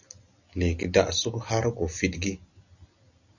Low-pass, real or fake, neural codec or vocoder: 7.2 kHz; real; none